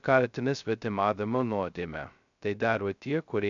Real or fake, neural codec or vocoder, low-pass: fake; codec, 16 kHz, 0.2 kbps, FocalCodec; 7.2 kHz